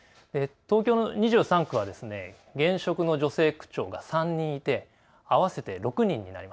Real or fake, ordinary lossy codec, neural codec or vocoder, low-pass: real; none; none; none